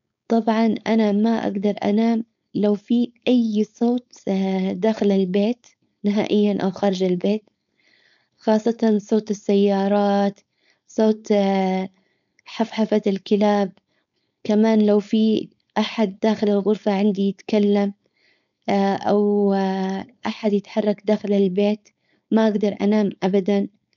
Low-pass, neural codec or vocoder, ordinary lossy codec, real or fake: 7.2 kHz; codec, 16 kHz, 4.8 kbps, FACodec; none; fake